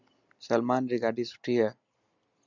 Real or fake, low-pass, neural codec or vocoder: real; 7.2 kHz; none